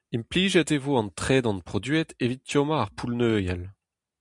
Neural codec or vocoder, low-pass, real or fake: none; 10.8 kHz; real